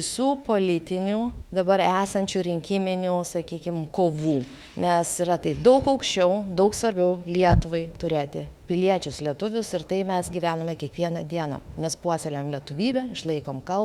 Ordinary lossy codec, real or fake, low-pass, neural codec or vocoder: Opus, 64 kbps; fake; 19.8 kHz; autoencoder, 48 kHz, 32 numbers a frame, DAC-VAE, trained on Japanese speech